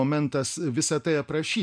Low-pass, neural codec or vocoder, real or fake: 9.9 kHz; none; real